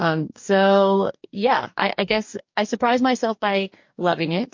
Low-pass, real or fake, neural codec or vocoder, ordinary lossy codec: 7.2 kHz; fake; codec, 44.1 kHz, 2.6 kbps, DAC; MP3, 48 kbps